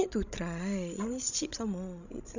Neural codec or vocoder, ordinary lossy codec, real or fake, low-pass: codec, 16 kHz, 16 kbps, FreqCodec, larger model; none; fake; 7.2 kHz